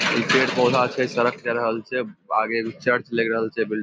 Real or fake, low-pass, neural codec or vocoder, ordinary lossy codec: real; none; none; none